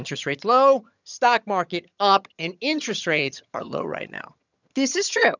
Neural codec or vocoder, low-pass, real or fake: vocoder, 22.05 kHz, 80 mel bands, HiFi-GAN; 7.2 kHz; fake